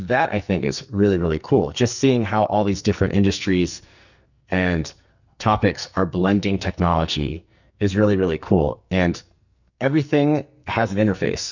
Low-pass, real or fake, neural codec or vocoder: 7.2 kHz; fake; codec, 44.1 kHz, 2.6 kbps, SNAC